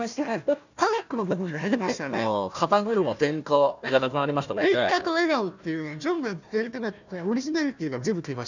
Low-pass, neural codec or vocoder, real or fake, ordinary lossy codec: 7.2 kHz; codec, 16 kHz, 1 kbps, FunCodec, trained on Chinese and English, 50 frames a second; fake; none